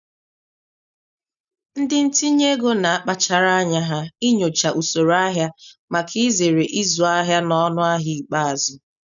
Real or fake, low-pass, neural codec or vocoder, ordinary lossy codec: real; 7.2 kHz; none; none